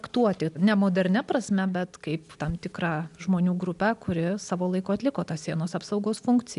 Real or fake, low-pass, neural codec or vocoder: real; 10.8 kHz; none